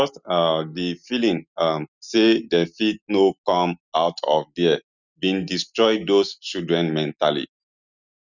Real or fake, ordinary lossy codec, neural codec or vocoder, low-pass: real; none; none; 7.2 kHz